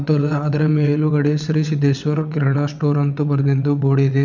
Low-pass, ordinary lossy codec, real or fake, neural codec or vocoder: 7.2 kHz; none; fake; vocoder, 22.05 kHz, 80 mel bands, WaveNeXt